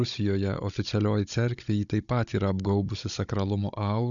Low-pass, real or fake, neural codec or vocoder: 7.2 kHz; fake; codec, 16 kHz, 16 kbps, FunCodec, trained on Chinese and English, 50 frames a second